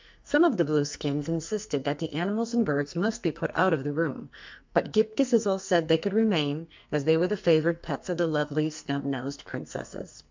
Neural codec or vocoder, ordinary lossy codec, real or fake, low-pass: codec, 44.1 kHz, 2.6 kbps, SNAC; MP3, 64 kbps; fake; 7.2 kHz